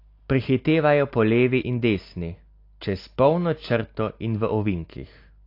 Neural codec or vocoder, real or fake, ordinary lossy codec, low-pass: none; real; AAC, 32 kbps; 5.4 kHz